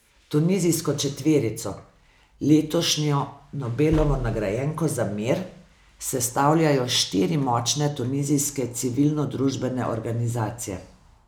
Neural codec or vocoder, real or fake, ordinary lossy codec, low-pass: none; real; none; none